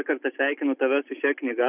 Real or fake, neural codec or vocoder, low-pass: real; none; 3.6 kHz